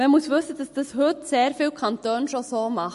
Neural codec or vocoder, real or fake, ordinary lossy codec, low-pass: none; real; MP3, 48 kbps; 14.4 kHz